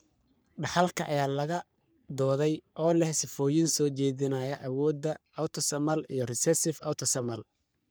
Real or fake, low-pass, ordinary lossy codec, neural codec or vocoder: fake; none; none; codec, 44.1 kHz, 3.4 kbps, Pupu-Codec